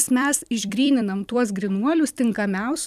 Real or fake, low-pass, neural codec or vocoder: fake; 14.4 kHz; vocoder, 44.1 kHz, 128 mel bands every 256 samples, BigVGAN v2